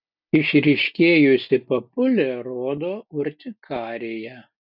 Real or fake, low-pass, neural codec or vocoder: real; 5.4 kHz; none